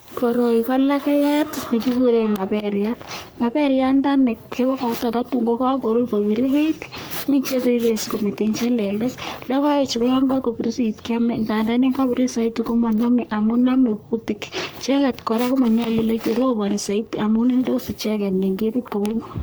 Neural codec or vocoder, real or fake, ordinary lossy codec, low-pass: codec, 44.1 kHz, 3.4 kbps, Pupu-Codec; fake; none; none